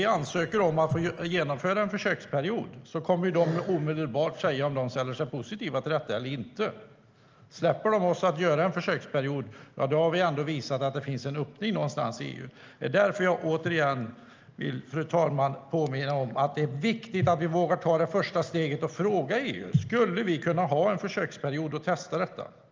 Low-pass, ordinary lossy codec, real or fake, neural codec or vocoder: 7.2 kHz; Opus, 24 kbps; real; none